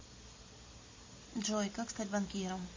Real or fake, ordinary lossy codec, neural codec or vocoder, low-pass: real; MP3, 32 kbps; none; 7.2 kHz